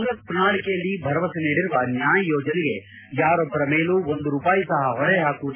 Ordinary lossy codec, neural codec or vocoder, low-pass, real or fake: MP3, 24 kbps; none; 3.6 kHz; real